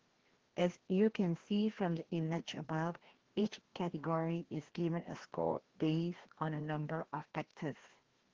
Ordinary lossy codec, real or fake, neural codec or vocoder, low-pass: Opus, 16 kbps; fake; codec, 16 kHz, 1 kbps, FreqCodec, larger model; 7.2 kHz